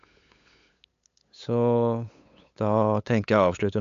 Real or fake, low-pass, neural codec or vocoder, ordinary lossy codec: fake; 7.2 kHz; codec, 16 kHz, 8 kbps, FunCodec, trained on LibriTTS, 25 frames a second; MP3, 64 kbps